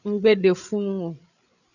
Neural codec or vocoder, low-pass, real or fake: codec, 16 kHz, 8 kbps, FreqCodec, larger model; 7.2 kHz; fake